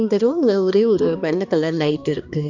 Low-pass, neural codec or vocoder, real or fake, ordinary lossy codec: 7.2 kHz; codec, 16 kHz, 2 kbps, X-Codec, HuBERT features, trained on balanced general audio; fake; none